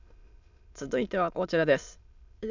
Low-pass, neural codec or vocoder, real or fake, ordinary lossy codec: 7.2 kHz; autoencoder, 22.05 kHz, a latent of 192 numbers a frame, VITS, trained on many speakers; fake; none